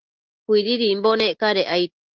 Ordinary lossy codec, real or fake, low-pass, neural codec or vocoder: Opus, 16 kbps; real; 7.2 kHz; none